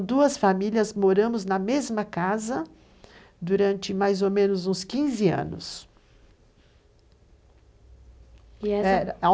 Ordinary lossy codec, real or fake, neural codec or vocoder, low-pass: none; real; none; none